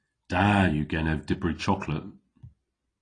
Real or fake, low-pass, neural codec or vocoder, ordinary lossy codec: real; 9.9 kHz; none; AAC, 32 kbps